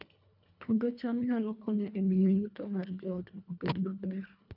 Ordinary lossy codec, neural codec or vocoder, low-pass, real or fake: none; codec, 24 kHz, 1.5 kbps, HILCodec; 5.4 kHz; fake